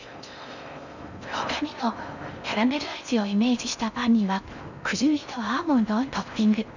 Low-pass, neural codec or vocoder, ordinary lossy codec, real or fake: 7.2 kHz; codec, 16 kHz in and 24 kHz out, 0.6 kbps, FocalCodec, streaming, 4096 codes; none; fake